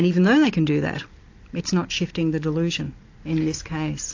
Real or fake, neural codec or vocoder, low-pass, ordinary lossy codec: real; none; 7.2 kHz; AAC, 48 kbps